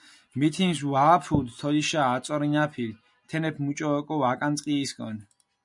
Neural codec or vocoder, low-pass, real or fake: none; 10.8 kHz; real